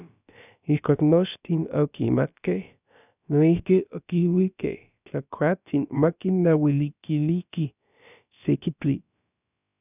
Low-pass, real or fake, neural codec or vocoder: 3.6 kHz; fake; codec, 16 kHz, about 1 kbps, DyCAST, with the encoder's durations